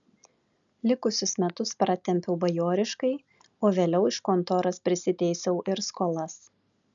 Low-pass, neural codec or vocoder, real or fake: 7.2 kHz; none; real